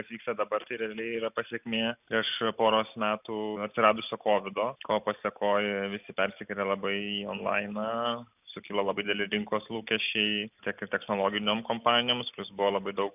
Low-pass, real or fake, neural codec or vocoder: 3.6 kHz; real; none